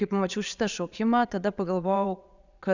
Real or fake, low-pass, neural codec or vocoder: fake; 7.2 kHz; vocoder, 22.05 kHz, 80 mel bands, Vocos